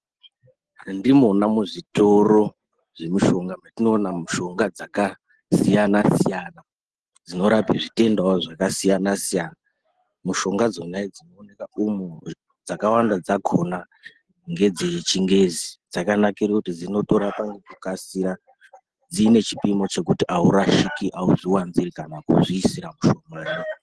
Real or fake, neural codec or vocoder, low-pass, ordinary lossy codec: real; none; 10.8 kHz; Opus, 16 kbps